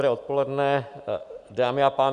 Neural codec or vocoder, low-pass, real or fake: none; 10.8 kHz; real